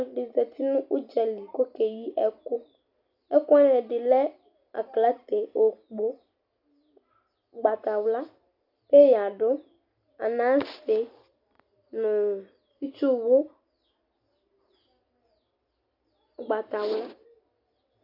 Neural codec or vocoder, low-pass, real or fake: none; 5.4 kHz; real